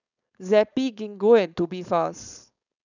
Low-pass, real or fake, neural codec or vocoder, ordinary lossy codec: 7.2 kHz; fake; codec, 16 kHz, 4.8 kbps, FACodec; none